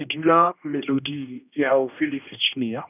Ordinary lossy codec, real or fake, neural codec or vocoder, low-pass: none; fake; codec, 16 kHz, 1 kbps, X-Codec, HuBERT features, trained on general audio; 3.6 kHz